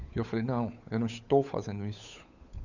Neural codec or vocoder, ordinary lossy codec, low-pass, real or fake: codec, 16 kHz, 8 kbps, FunCodec, trained on Chinese and English, 25 frames a second; none; 7.2 kHz; fake